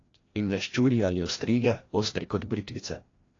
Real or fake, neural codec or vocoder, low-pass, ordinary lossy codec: fake; codec, 16 kHz, 1 kbps, FreqCodec, larger model; 7.2 kHz; AAC, 32 kbps